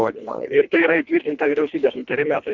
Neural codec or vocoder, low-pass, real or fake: codec, 24 kHz, 1.5 kbps, HILCodec; 7.2 kHz; fake